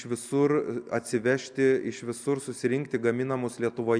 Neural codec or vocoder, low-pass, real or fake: none; 9.9 kHz; real